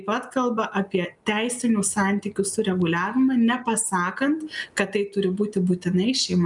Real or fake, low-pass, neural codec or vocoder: real; 10.8 kHz; none